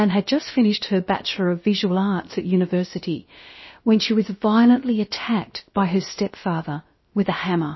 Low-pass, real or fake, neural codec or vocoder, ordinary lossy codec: 7.2 kHz; fake; codec, 16 kHz, about 1 kbps, DyCAST, with the encoder's durations; MP3, 24 kbps